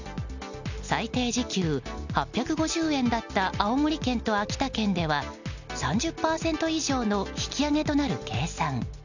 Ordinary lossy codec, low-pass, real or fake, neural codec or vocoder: none; 7.2 kHz; real; none